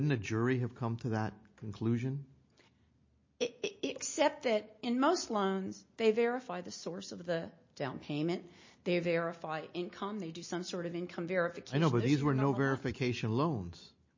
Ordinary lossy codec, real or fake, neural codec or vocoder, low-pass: MP3, 32 kbps; real; none; 7.2 kHz